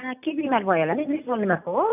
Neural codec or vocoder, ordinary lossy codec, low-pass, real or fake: none; none; 3.6 kHz; real